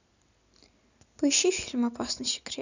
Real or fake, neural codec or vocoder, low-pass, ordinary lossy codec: real; none; 7.2 kHz; none